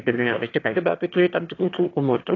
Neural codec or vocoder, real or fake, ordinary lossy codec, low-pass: autoencoder, 22.05 kHz, a latent of 192 numbers a frame, VITS, trained on one speaker; fake; AAC, 32 kbps; 7.2 kHz